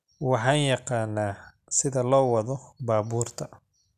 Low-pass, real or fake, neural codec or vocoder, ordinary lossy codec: 14.4 kHz; real; none; none